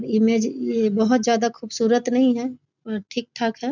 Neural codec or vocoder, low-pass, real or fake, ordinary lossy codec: none; 7.2 kHz; real; none